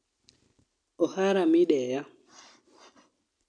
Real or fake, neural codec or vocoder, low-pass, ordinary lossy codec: real; none; 9.9 kHz; none